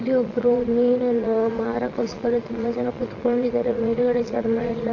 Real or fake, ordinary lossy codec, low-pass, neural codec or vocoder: fake; AAC, 48 kbps; 7.2 kHz; vocoder, 22.05 kHz, 80 mel bands, Vocos